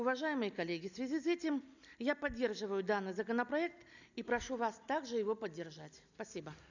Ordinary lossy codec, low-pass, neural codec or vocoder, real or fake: none; 7.2 kHz; none; real